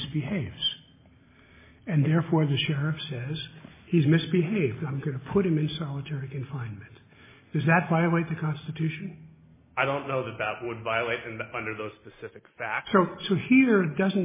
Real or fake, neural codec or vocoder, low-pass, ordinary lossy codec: real; none; 3.6 kHz; MP3, 16 kbps